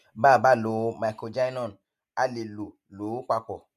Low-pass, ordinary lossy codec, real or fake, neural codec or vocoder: 14.4 kHz; MP3, 64 kbps; real; none